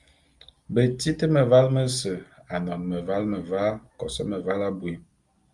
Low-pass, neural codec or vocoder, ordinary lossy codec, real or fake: 10.8 kHz; none; Opus, 32 kbps; real